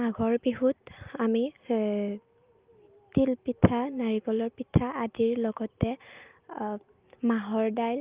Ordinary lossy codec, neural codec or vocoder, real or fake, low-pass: Opus, 32 kbps; none; real; 3.6 kHz